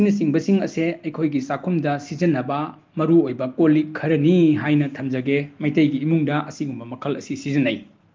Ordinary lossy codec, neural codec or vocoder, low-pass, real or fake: Opus, 24 kbps; none; 7.2 kHz; real